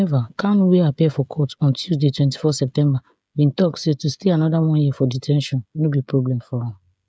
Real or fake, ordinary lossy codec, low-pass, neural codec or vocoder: fake; none; none; codec, 16 kHz, 16 kbps, FreqCodec, smaller model